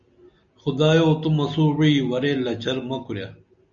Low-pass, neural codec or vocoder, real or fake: 7.2 kHz; none; real